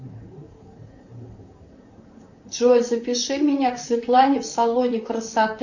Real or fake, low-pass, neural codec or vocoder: fake; 7.2 kHz; vocoder, 44.1 kHz, 128 mel bands, Pupu-Vocoder